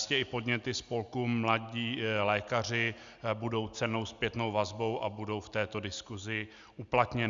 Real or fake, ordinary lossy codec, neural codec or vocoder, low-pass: real; Opus, 64 kbps; none; 7.2 kHz